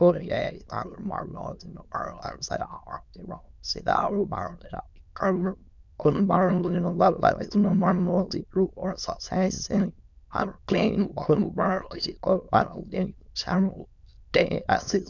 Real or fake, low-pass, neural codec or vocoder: fake; 7.2 kHz; autoencoder, 22.05 kHz, a latent of 192 numbers a frame, VITS, trained on many speakers